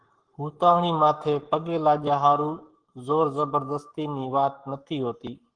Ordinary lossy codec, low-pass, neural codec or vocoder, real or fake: Opus, 16 kbps; 9.9 kHz; codec, 44.1 kHz, 7.8 kbps, Pupu-Codec; fake